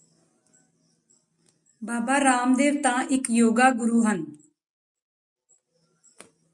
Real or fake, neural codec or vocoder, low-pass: real; none; 10.8 kHz